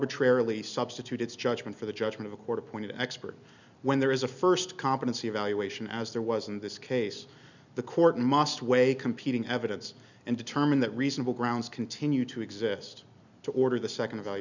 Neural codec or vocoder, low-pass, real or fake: none; 7.2 kHz; real